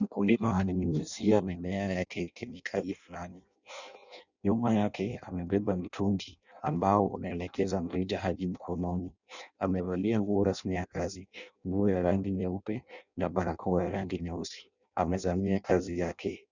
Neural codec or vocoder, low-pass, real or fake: codec, 16 kHz in and 24 kHz out, 0.6 kbps, FireRedTTS-2 codec; 7.2 kHz; fake